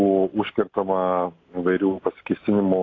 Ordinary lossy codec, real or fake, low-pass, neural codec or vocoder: AAC, 32 kbps; real; 7.2 kHz; none